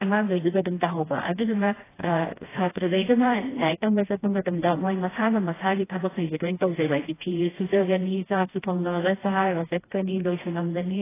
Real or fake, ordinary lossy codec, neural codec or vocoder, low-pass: fake; AAC, 16 kbps; codec, 16 kHz, 1 kbps, FreqCodec, smaller model; 3.6 kHz